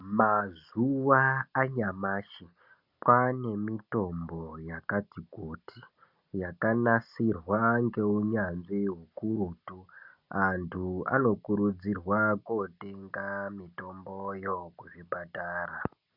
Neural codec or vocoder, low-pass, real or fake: none; 5.4 kHz; real